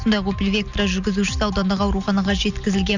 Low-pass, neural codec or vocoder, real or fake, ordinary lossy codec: 7.2 kHz; none; real; none